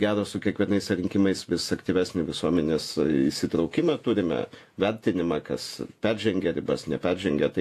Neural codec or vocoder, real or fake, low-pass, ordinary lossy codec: vocoder, 48 kHz, 128 mel bands, Vocos; fake; 14.4 kHz; AAC, 64 kbps